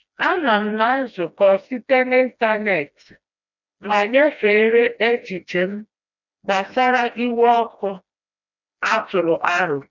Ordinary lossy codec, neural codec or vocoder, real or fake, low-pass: none; codec, 16 kHz, 1 kbps, FreqCodec, smaller model; fake; 7.2 kHz